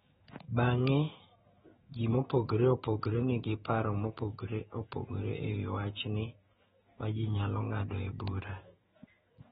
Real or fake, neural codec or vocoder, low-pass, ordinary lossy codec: fake; autoencoder, 48 kHz, 128 numbers a frame, DAC-VAE, trained on Japanese speech; 19.8 kHz; AAC, 16 kbps